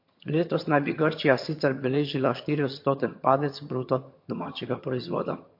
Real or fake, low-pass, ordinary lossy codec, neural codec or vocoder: fake; 5.4 kHz; MP3, 32 kbps; vocoder, 22.05 kHz, 80 mel bands, HiFi-GAN